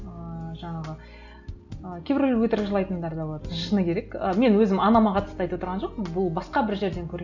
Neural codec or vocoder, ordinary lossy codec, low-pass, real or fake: none; none; 7.2 kHz; real